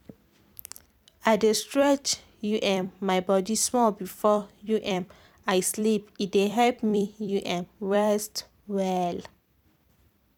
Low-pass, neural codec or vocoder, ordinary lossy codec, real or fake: 19.8 kHz; vocoder, 44.1 kHz, 128 mel bands every 256 samples, BigVGAN v2; none; fake